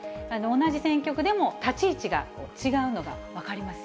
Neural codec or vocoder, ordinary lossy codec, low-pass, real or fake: none; none; none; real